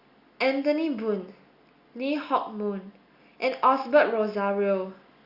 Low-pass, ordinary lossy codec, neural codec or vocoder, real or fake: 5.4 kHz; Opus, 64 kbps; none; real